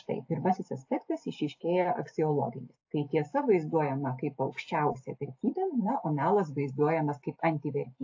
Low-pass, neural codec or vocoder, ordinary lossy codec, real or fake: 7.2 kHz; none; AAC, 48 kbps; real